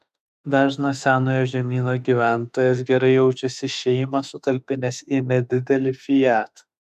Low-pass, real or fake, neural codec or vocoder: 14.4 kHz; fake; autoencoder, 48 kHz, 32 numbers a frame, DAC-VAE, trained on Japanese speech